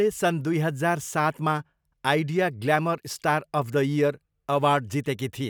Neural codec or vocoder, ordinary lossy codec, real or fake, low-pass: none; none; real; none